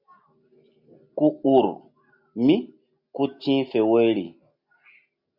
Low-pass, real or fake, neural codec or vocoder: 5.4 kHz; real; none